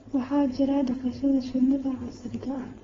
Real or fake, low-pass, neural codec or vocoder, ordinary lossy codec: fake; 7.2 kHz; codec, 16 kHz, 4.8 kbps, FACodec; AAC, 24 kbps